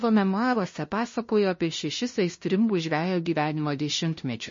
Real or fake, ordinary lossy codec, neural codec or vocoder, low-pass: fake; MP3, 32 kbps; codec, 16 kHz, 0.5 kbps, FunCodec, trained on LibriTTS, 25 frames a second; 7.2 kHz